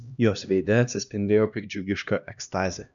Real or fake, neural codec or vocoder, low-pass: fake; codec, 16 kHz, 1 kbps, X-Codec, HuBERT features, trained on LibriSpeech; 7.2 kHz